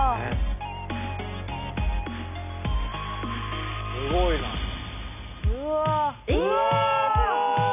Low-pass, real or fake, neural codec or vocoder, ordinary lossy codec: 3.6 kHz; real; none; none